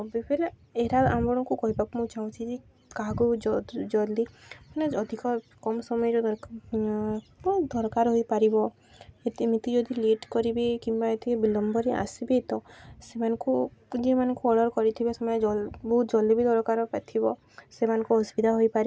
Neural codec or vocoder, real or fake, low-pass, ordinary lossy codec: none; real; none; none